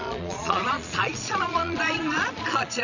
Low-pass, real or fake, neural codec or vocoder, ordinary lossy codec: 7.2 kHz; fake; vocoder, 22.05 kHz, 80 mel bands, Vocos; none